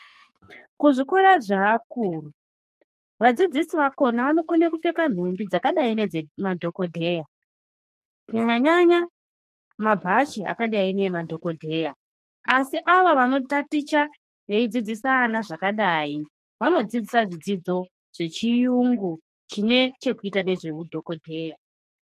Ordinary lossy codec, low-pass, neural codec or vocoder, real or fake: MP3, 64 kbps; 14.4 kHz; codec, 44.1 kHz, 2.6 kbps, SNAC; fake